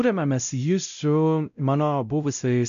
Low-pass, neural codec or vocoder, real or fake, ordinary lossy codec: 7.2 kHz; codec, 16 kHz, 0.5 kbps, X-Codec, WavLM features, trained on Multilingual LibriSpeech; fake; AAC, 96 kbps